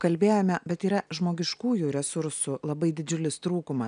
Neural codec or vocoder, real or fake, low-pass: none; real; 9.9 kHz